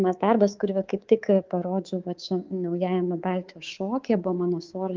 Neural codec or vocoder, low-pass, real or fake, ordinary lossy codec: codec, 24 kHz, 3.1 kbps, DualCodec; 7.2 kHz; fake; Opus, 24 kbps